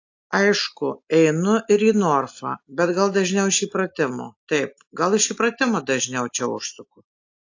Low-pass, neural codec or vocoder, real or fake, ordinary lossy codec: 7.2 kHz; none; real; AAC, 48 kbps